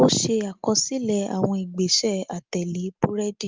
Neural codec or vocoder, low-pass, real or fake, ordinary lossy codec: none; 7.2 kHz; real; Opus, 32 kbps